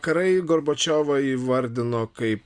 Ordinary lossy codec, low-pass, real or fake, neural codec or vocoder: Opus, 64 kbps; 9.9 kHz; real; none